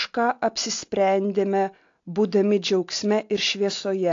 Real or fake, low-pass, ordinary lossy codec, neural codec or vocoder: real; 7.2 kHz; AAC, 48 kbps; none